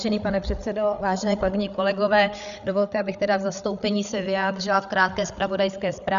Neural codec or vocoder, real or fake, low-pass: codec, 16 kHz, 8 kbps, FreqCodec, larger model; fake; 7.2 kHz